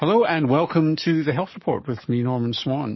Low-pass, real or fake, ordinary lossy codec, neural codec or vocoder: 7.2 kHz; fake; MP3, 24 kbps; codec, 44.1 kHz, 7.8 kbps, DAC